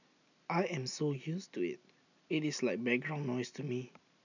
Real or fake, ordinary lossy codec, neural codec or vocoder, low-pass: real; none; none; 7.2 kHz